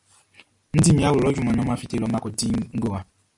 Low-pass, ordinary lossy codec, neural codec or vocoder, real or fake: 10.8 kHz; AAC, 64 kbps; none; real